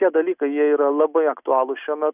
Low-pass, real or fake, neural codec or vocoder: 3.6 kHz; real; none